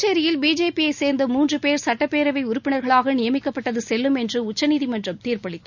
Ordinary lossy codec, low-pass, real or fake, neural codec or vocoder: none; 7.2 kHz; real; none